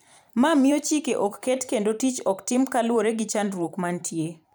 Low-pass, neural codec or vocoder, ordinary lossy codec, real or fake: none; vocoder, 44.1 kHz, 128 mel bands every 512 samples, BigVGAN v2; none; fake